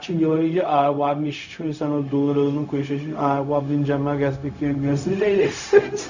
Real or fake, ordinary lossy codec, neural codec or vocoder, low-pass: fake; none; codec, 16 kHz, 0.4 kbps, LongCat-Audio-Codec; 7.2 kHz